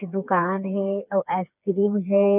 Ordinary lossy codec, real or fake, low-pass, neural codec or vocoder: none; fake; 3.6 kHz; codec, 16 kHz, 4 kbps, FreqCodec, smaller model